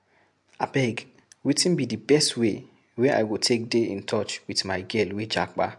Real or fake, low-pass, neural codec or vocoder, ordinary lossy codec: real; 10.8 kHz; none; MP3, 64 kbps